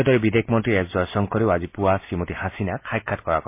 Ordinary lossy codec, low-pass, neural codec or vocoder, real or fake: MP3, 24 kbps; 3.6 kHz; none; real